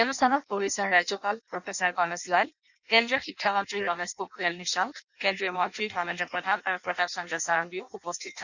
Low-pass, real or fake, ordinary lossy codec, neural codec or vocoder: 7.2 kHz; fake; none; codec, 16 kHz in and 24 kHz out, 0.6 kbps, FireRedTTS-2 codec